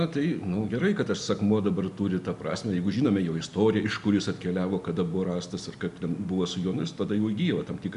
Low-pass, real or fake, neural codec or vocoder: 10.8 kHz; real; none